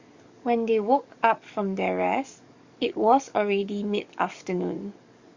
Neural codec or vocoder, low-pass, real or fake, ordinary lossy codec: codec, 44.1 kHz, 7.8 kbps, DAC; 7.2 kHz; fake; Opus, 64 kbps